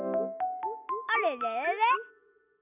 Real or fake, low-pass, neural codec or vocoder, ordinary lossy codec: real; 3.6 kHz; none; AAC, 32 kbps